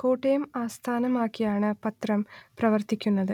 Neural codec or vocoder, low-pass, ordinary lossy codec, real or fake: none; 19.8 kHz; none; real